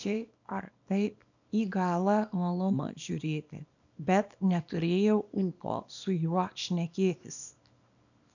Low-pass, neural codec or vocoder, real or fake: 7.2 kHz; codec, 24 kHz, 0.9 kbps, WavTokenizer, small release; fake